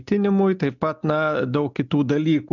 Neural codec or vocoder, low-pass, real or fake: none; 7.2 kHz; real